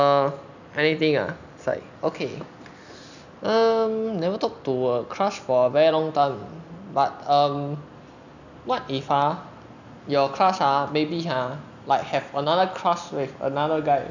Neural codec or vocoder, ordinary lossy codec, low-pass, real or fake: none; none; 7.2 kHz; real